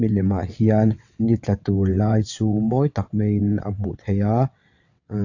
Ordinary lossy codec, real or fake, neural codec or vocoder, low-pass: AAC, 48 kbps; fake; vocoder, 22.05 kHz, 80 mel bands, WaveNeXt; 7.2 kHz